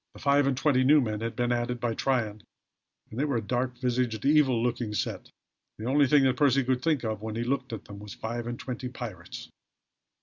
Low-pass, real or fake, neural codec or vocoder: 7.2 kHz; real; none